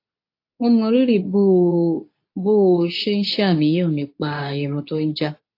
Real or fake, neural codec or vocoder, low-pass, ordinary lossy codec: fake; codec, 24 kHz, 0.9 kbps, WavTokenizer, medium speech release version 2; 5.4 kHz; AAC, 32 kbps